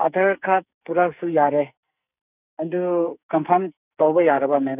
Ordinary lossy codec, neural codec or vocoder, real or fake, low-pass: none; codec, 44.1 kHz, 2.6 kbps, SNAC; fake; 3.6 kHz